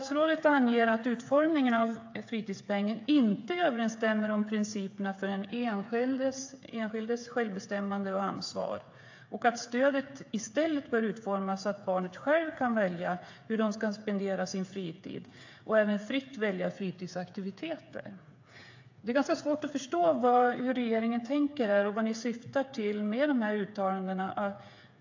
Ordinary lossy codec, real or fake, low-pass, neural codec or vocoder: AAC, 48 kbps; fake; 7.2 kHz; codec, 16 kHz, 8 kbps, FreqCodec, smaller model